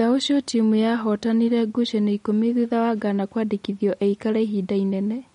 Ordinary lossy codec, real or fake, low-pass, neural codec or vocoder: MP3, 48 kbps; real; 10.8 kHz; none